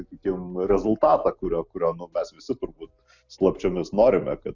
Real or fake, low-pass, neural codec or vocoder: real; 7.2 kHz; none